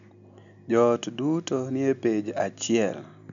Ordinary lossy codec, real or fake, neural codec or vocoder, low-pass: MP3, 96 kbps; real; none; 7.2 kHz